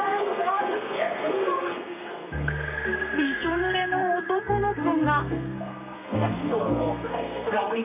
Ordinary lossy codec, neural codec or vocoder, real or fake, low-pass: none; codec, 32 kHz, 1.9 kbps, SNAC; fake; 3.6 kHz